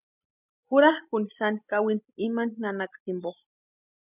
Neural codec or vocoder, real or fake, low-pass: none; real; 3.6 kHz